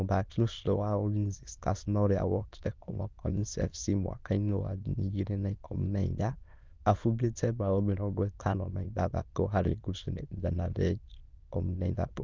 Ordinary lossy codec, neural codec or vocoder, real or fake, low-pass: Opus, 24 kbps; autoencoder, 22.05 kHz, a latent of 192 numbers a frame, VITS, trained on many speakers; fake; 7.2 kHz